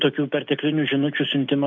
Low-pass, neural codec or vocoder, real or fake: 7.2 kHz; none; real